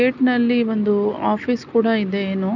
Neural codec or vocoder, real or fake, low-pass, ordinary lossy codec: none; real; 7.2 kHz; none